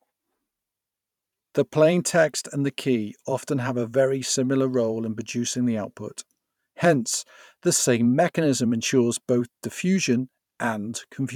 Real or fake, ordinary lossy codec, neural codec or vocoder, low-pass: fake; none; vocoder, 44.1 kHz, 128 mel bands every 512 samples, BigVGAN v2; 19.8 kHz